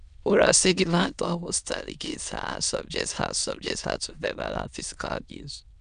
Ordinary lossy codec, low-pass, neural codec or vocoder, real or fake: none; 9.9 kHz; autoencoder, 22.05 kHz, a latent of 192 numbers a frame, VITS, trained on many speakers; fake